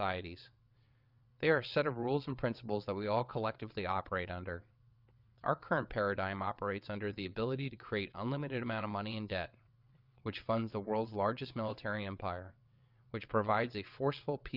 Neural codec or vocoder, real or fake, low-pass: vocoder, 22.05 kHz, 80 mel bands, WaveNeXt; fake; 5.4 kHz